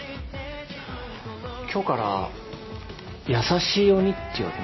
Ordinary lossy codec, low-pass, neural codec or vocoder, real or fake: MP3, 24 kbps; 7.2 kHz; none; real